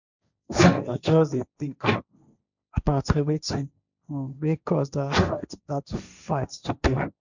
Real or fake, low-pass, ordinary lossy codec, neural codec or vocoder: fake; 7.2 kHz; none; codec, 16 kHz, 1.1 kbps, Voila-Tokenizer